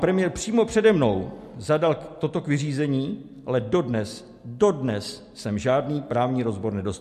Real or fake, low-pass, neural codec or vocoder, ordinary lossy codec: real; 14.4 kHz; none; MP3, 64 kbps